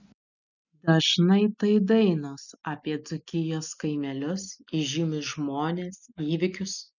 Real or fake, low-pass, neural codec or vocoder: real; 7.2 kHz; none